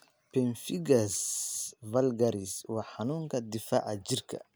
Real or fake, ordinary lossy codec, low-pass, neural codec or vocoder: real; none; none; none